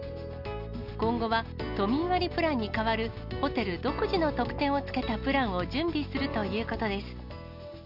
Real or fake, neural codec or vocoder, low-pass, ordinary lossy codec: real; none; 5.4 kHz; none